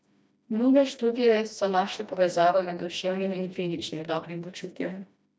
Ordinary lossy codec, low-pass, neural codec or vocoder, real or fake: none; none; codec, 16 kHz, 1 kbps, FreqCodec, smaller model; fake